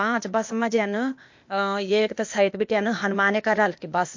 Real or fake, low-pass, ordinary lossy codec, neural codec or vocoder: fake; 7.2 kHz; MP3, 48 kbps; codec, 16 kHz, 0.8 kbps, ZipCodec